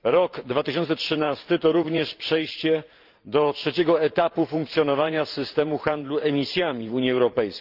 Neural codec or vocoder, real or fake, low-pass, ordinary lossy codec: vocoder, 44.1 kHz, 128 mel bands every 512 samples, BigVGAN v2; fake; 5.4 kHz; Opus, 24 kbps